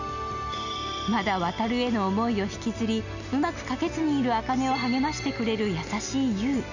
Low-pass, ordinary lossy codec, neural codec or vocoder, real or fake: 7.2 kHz; none; none; real